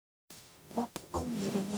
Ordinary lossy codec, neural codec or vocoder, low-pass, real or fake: none; codec, 44.1 kHz, 0.9 kbps, DAC; none; fake